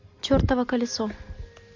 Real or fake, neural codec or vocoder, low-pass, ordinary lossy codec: real; none; 7.2 kHz; MP3, 48 kbps